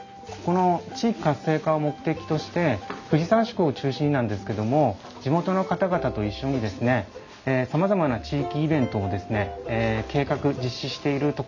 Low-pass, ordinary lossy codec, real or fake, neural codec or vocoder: 7.2 kHz; none; real; none